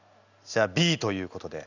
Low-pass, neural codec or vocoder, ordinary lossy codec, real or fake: 7.2 kHz; none; none; real